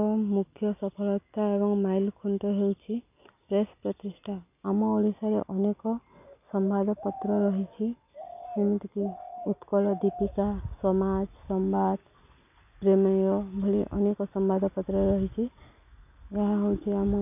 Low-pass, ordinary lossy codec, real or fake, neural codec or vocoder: 3.6 kHz; none; real; none